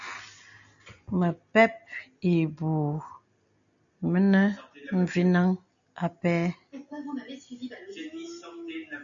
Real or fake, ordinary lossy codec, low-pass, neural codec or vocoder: real; MP3, 64 kbps; 7.2 kHz; none